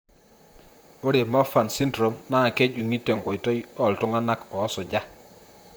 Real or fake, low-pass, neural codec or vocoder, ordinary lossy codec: fake; none; vocoder, 44.1 kHz, 128 mel bands, Pupu-Vocoder; none